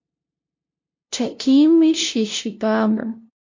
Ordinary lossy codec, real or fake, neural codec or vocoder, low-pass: MP3, 48 kbps; fake; codec, 16 kHz, 0.5 kbps, FunCodec, trained on LibriTTS, 25 frames a second; 7.2 kHz